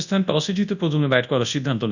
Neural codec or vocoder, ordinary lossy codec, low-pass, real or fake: codec, 24 kHz, 0.9 kbps, WavTokenizer, large speech release; none; 7.2 kHz; fake